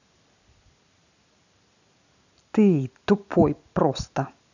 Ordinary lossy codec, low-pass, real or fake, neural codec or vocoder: none; 7.2 kHz; real; none